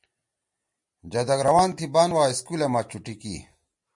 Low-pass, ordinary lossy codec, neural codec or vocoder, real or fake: 10.8 kHz; MP3, 48 kbps; none; real